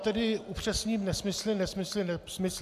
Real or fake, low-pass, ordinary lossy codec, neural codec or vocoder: real; 14.4 kHz; MP3, 96 kbps; none